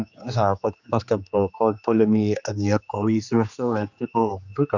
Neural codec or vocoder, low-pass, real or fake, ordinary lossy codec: codec, 16 kHz, 2 kbps, X-Codec, HuBERT features, trained on general audio; 7.2 kHz; fake; none